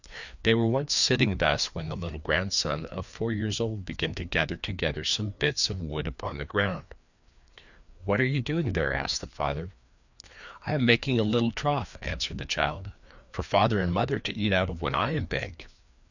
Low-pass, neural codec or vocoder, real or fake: 7.2 kHz; codec, 16 kHz, 2 kbps, FreqCodec, larger model; fake